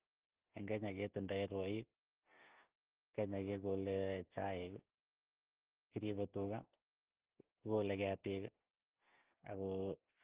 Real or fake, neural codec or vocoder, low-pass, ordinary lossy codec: real; none; 3.6 kHz; Opus, 16 kbps